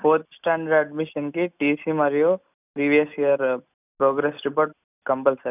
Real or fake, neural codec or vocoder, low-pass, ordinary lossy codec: real; none; 3.6 kHz; none